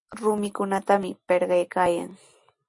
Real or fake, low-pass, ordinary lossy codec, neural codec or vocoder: real; 10.8 kHz; MP3, 48 kbps; none